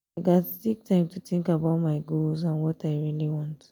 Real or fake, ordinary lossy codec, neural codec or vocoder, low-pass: real; none; none; 19.8 kHz